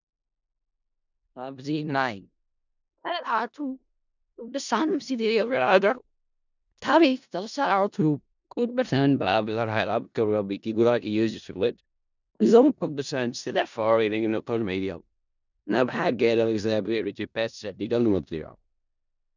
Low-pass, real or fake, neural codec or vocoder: 7.2 kHz; fake; codec, 16 kHz in and 24 kHz out, 0.4 kbps, LongCat-Audio-Codec, four codebook decoder